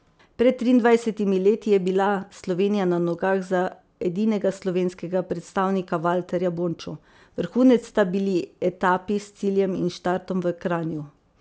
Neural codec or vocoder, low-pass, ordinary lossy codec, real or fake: none; none; none; real